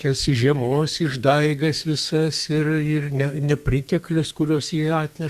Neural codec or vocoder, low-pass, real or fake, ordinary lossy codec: codec, 32 kHz, 1.9 kbps, SNAC; 14.4 kHz; fake; Opus, 64 kbps